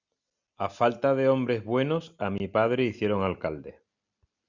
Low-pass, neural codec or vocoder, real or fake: 7.2 kHz; none; real